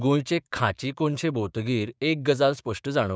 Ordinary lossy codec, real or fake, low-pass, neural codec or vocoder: none; fake; none; codec, 16 kHz, 6 kbps, DAC